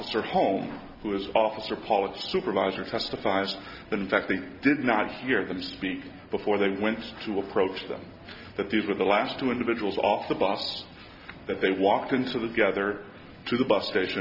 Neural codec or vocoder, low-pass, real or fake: none; 5.4 kHz; real